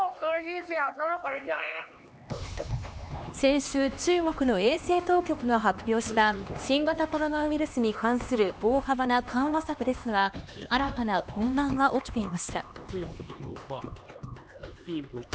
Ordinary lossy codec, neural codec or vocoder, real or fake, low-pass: none; codec, 16 kHz, 2 kbps, X-Codec, HuBERT features, trained on LibriSpeech; fake; none